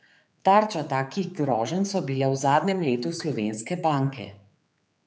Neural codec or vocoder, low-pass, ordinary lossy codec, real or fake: codec, 16 kHz, 4 kbps, X-Codec, HuBERT features, trained on general audio; none; none; fake